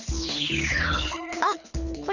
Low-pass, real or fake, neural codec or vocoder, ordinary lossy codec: 7.2 kHz; fake; codec, 24 kHz, 6 kbps, HILCodec; none